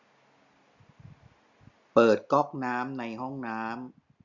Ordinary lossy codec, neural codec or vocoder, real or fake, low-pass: Opus, 64 kbps; none; real; 7.2 kHz